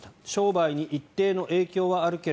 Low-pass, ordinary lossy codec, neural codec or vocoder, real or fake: none; none; none; real